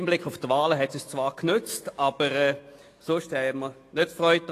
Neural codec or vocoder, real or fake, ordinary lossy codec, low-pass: autoencoder, 48 kHz, 128 numbers a frame, DAC-VAE, trained on Japanese speech; fake; AAC, 48 kbps; 14.4 kHz